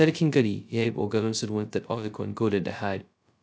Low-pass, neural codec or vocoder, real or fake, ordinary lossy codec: none; codec, 16 kHz, 0.2 kbps, FocalCodec; fake; none